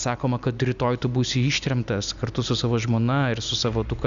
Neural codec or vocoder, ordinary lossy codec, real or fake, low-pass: none; Opus, 64 kbps; real; 7.2 kHz